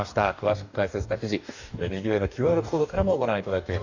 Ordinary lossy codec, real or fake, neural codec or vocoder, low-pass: none; fake; codec, 44.1 kHz, 2.6 kbps, DAC; 7.2 kHz